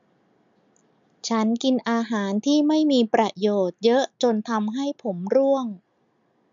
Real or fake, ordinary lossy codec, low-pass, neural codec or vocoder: real; none; 7.2 kHz; none